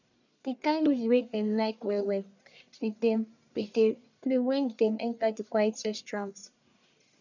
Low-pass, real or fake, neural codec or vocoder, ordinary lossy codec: 7.2 kHz; fake; codec, 44.1 kHz, 1.7 kbps, Pupu-Codec; none